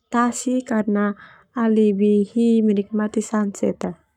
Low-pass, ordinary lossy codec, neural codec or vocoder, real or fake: 19.8 kHz; none; codec, 44.1 kHz, 7.8 kbps, Pupu-Codec; fake